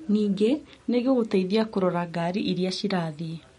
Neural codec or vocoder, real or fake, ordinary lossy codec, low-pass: none; real; MP3, 48 kbps; 19.8 kHz